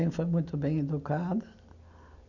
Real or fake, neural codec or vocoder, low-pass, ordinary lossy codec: real; none; 7.2 kHz; none